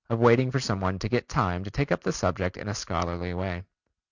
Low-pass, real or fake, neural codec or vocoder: 7.2 kHz; real; none